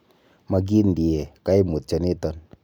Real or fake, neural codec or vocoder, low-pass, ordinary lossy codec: real; none; none; none